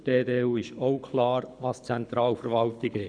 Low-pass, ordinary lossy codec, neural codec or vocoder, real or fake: 9.9 kHz; none; codec, 24 kHz, 6 kbps, HILCodec; fake